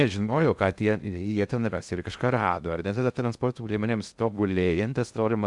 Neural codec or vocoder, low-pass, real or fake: codec, 16 kHz in and 24 kHz out, 0.6 kbps, FocalCodec, streaming, 2048 codes; 10.8 kHz; fake